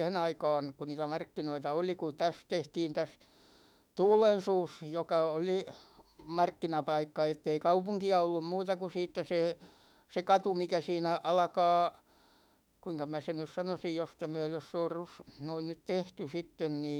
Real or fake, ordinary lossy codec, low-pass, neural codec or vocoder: fake; none; 19.8 kHz; autoencoder, 48 kHz, 32 numbers a frame, DAC-VAE, trained on Japanese speech